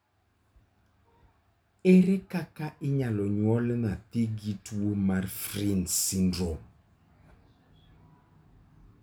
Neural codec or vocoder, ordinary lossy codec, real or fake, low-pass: none; none; real; none